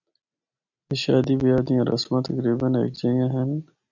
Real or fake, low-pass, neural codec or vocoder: real; 7.2 kHz; none